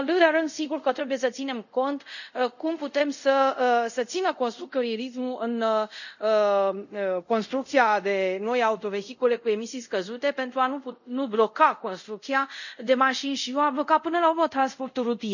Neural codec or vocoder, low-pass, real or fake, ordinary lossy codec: codec, 24 kHz, 0.5 kbps, DualCodec; 7.2 kHz; fake; none